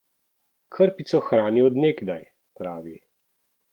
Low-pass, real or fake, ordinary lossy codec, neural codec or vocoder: 19.8 kHz; fake; Opus, 24 kbps; autoencoder, 48 kHz, 128 numbers a frame, DAC-VAE, trained on Japanese speech